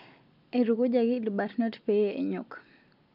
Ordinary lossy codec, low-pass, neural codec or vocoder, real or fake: none; 5.4 kHz; none; real